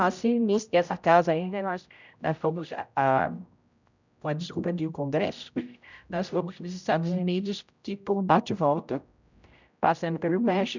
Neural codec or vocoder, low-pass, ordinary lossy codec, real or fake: codec, 16 kHz, 0.5 kbps, X-Codec, HuBERT features, trained on general audio; 7.2 kHz; none; fake